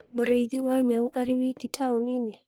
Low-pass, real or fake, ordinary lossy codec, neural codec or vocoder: none; fake; none; codec, 44.1 kHz, 1.7 kbps, Pupu-Codec